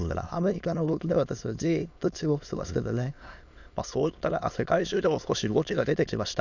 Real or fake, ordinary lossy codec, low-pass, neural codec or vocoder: fake; none; 7.2 kHz; autoencoder, 22.05 kHz, a latent of 192 numbers a frame, VITS, trained on many speakers